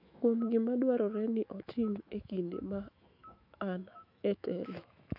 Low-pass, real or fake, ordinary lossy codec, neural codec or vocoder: 5.4 kHz; fake; none; autoencoder, 48 kHz, 128 numbers a frame, DAC-VAE, trained on Japanese speech